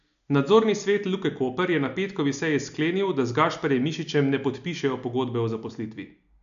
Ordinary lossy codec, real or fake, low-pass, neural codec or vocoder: AAC, 96 kbps; real; 7.2 kHz; none